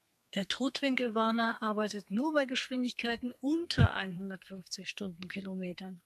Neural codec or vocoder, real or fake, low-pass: codec, 32 kHz, 1.9 kbps, SNAC; fake; 14.4 kHz